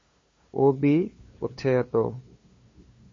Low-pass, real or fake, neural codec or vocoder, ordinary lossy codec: 7.2 kHz; fake; codec, 16 kHz, 2 kbps, FunCodec, trained on LibriTTS, 25 frames a second; MP3, 32 kbps